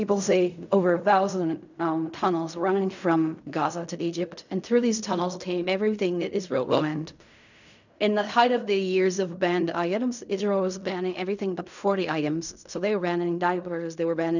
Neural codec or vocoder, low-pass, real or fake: codec, 16 kHz in and 24 kHz out, 0.4 kbps, LongCat-Audio-Codec, fine tuned four codebook decoder; 7.2 kHz; fake